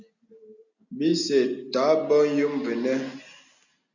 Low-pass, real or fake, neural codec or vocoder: 7.2 kHz; real; none